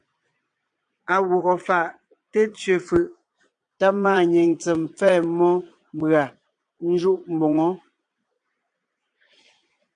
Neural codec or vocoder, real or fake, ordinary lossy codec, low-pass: vocoder, 22.05 kHz, 80 mel bands, Vocos; fake; AAC, 64 kbps; 9.9 kHz